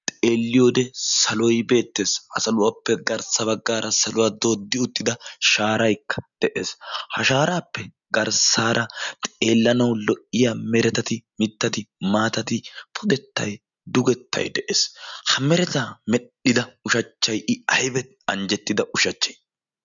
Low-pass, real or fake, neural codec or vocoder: 7.2 kHz; real; none